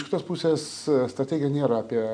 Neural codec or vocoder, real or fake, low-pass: none; real; 9.9 kHz